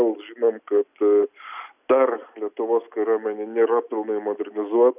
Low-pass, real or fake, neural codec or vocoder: 3.6 kHz; real; none